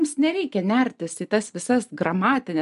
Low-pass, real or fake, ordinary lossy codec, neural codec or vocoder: 10.8 kHz; real; MP3, 64 kbps; none